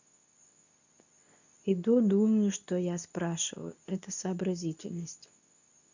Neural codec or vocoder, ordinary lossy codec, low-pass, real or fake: codec, 24 kHz, 0.9 kbps, WavTokenizer, medium speech release version 2; none; 7.2 kHz; fake